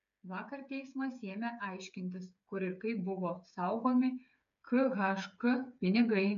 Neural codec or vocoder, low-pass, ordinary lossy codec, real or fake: codec, 16 kHz, 8 kbps, FreqCodec, smaller model; 7.2 kHz; MP3, 64 kbps; fake